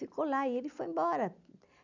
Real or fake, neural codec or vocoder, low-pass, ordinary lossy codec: real; none; 7.2 kHz; none